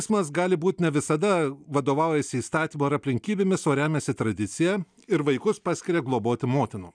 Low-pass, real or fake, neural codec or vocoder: 9.9 kHz; real; none